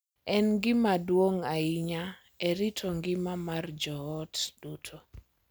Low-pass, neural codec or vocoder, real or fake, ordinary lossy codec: none; none; real; none